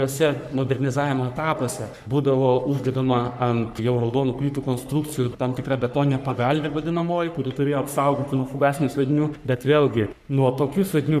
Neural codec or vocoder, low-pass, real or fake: codec, 44.1 kHz, 3.4 kbps, Pupu-Codec; 14.4 kHz; fake